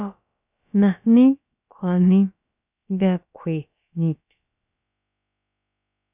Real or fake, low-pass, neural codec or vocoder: fake; 3.6 kHz; codec, 16 kHz, about 1 kbps, DyCAST, with the encoder's durations